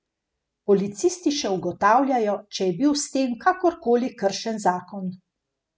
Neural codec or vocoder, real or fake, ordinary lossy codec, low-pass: none; real; none; none